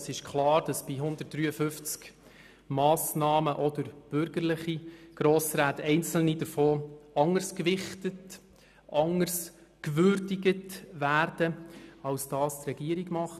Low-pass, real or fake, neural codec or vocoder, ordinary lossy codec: 14.4 kHz; real; none; none